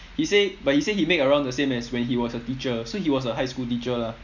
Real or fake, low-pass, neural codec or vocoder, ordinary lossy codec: real; 7.2 kHz; none; none